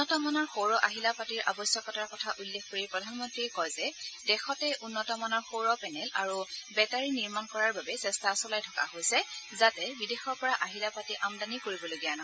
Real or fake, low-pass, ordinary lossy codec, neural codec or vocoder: real; none; none; none